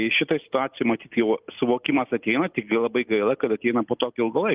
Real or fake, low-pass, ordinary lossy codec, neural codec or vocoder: real; 3.6 kHz; Opus, 32 kbps; none